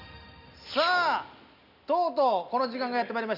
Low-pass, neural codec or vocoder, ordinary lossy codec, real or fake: 5.4 kHz; none; none; real